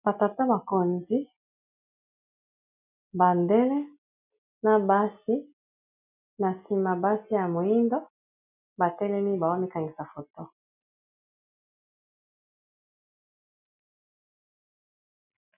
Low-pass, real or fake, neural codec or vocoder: 3.6 kHz; real; none